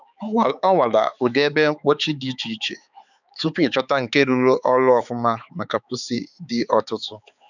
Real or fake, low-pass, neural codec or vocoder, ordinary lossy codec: fake; 7.2 kHz; codec, 16 kHz, 4 kbps, X-Codec, HuBERT features, trained on balanced general audio; none